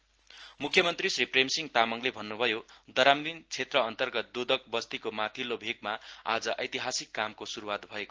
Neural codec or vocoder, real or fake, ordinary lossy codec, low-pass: none; real; Opus, 16 kbps; 7.2 kHz